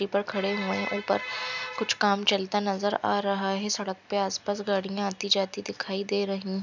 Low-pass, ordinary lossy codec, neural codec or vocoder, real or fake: 7.2 kHz; none; none; real